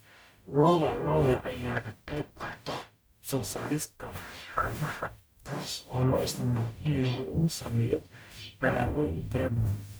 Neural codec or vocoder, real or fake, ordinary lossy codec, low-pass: codec, 44.1 kHz, 0.9 kbps, DAC; fake; none; none